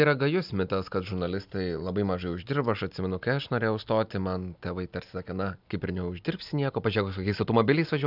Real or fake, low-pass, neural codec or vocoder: real; 5.4 kHz; none